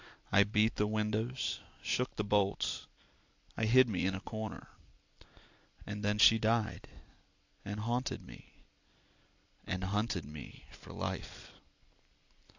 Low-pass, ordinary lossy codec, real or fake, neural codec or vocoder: 7.2 kHz; AAC, 48 kbps; real; none